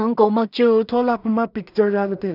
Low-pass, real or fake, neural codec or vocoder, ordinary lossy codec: 5.4 kHz; fake; codec, 16 kHz in and 24 kHz out, 0.4 kbps, LongCat-Audio-Codec, two codebook decoder; none